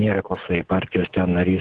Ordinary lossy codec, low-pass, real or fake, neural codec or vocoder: Opus, 16 kbps; 10.8 kHz; real; none